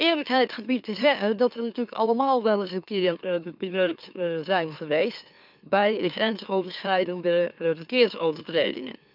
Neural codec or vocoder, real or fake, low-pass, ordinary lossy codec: autoencoder, 44.1 kHz, a latent of 192 numbers a frame, MeloTTS; fake; 5.4 kHz; none